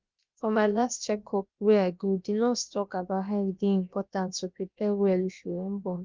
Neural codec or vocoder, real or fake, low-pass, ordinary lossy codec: codec, 16 kHz, about 1 kbps, DyCAST, with the encoder's durations; fake; 7.2 kHz; Opus, 24 kbps